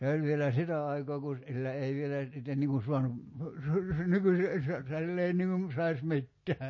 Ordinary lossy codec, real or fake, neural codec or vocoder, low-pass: MP3, 32 kbps; real; none; 7.2 kHz